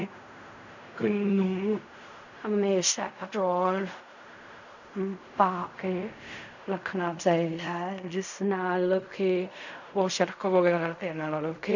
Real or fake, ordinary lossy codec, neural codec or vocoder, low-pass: fake; none; codec, 16 kHz in and 24 kHz out, 0.4 kbps, LongCat-Audio-Codec, fine tuned four codebook decoder; 7.2 kHz